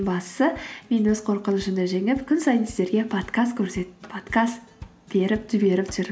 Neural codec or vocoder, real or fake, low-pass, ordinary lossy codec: none; real; none; none